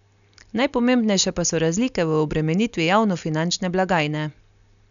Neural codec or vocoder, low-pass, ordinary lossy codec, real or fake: none; 7.2 kHz; none; real